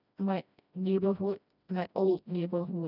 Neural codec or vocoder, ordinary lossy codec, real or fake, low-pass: codec, 16 kHz, 1 kbps, FreqCodec, smaller model; none; fake; 5.4 kHz